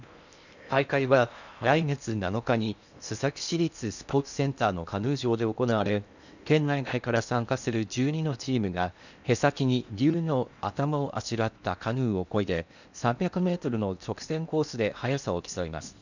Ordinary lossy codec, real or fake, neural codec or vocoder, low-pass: none; fake; codec, 16 kHz in and 24 kHz out, 0.8 kbps, FocalCodec, streaming, 65536 codes; 7.2 kHz